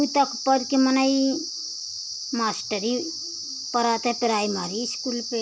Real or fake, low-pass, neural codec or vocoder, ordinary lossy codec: real; none; none; none